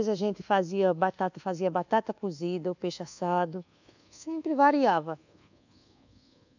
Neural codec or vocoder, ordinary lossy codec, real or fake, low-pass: codec, 24 kHz, 1.2 kbps, DualCodec; none; fake; 7.2 kHz